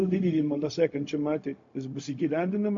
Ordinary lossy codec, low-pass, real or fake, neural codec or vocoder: MP3, 48 kbps; 7.2 kHz; fake; codec, 16 kHz, 0.4 kbps, LongCat-Audio-Codec